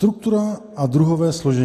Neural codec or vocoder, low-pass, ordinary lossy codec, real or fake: none; 14.4 kHz; AAC, 48 kbps; real